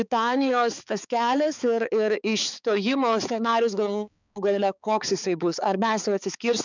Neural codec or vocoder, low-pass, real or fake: codec, 16 kHz, 4 kbps, X-Codec, HuBERT features, trained on balanced general audio; 7.2 kHz; fake